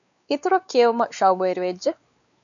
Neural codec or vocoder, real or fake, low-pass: codec, 16 kHz, 4 kbps, X-Codec, WavLM features, trained on Multilingual LibriSpeech; fake; 7.2 kHz